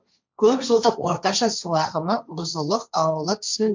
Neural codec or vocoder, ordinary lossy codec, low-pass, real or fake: codec, 16 kHz, 1.1 kbps, Voila-Tokenizer; MP3, 64 kbps; 7.2 kHz; fake